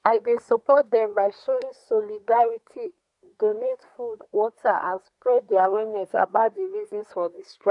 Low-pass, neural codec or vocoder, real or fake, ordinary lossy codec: 10.8 kHz; codec, 24 kHz, 1 kbps, SNAC; fake; none